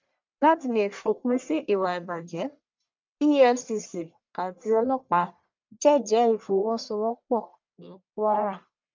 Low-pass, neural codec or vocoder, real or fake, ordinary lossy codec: 7.2 kHz; codec, 44.1 kHz, 1.7 kbps, Pupu-Codec; fake; MP3, 64 kbps